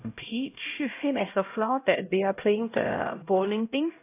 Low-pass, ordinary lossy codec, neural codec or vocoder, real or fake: 3.6 kHz; AAC, 24 kbps; codec, 16 kHz, 0.5 kbps, X-Codec, HuBERT features, trained on LibriSpeech; fake